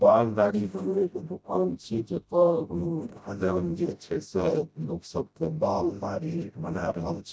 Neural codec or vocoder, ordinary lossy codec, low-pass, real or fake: codec, 16 kHz, 0.5 kbps, FreqCodec, smaller model; none; none; fake